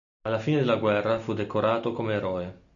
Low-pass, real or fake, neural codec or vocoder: 7.2 kHz; real; none